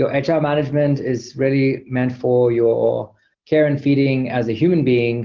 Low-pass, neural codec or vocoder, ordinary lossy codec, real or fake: 7.2 kHz; none; Opus, 24 kbps; real